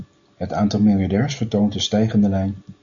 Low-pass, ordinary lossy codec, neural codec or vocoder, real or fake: 7.2 kHz; Opus, 64 kbps; none; real